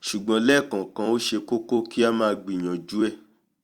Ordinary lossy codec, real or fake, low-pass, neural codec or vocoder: Opus, 64 kbps; fake; 19.8 kHz; vocoder, 44.1 kHz, 128 mel bands every 256 samples, BigVGAN v2